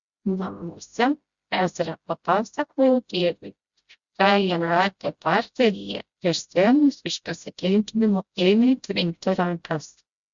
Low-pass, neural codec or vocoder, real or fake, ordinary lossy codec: 7.2 kHz; codec, 16 kHz, 0.5 kbps, FreqCodec, smaller model; fake; Opus, 64 kbps